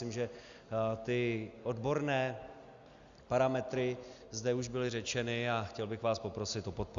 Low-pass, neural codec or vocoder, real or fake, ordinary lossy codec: 7.2 kHz; none; real; Opus, 64 kbps